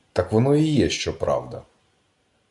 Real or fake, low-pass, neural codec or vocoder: real; 10.8 kHz; none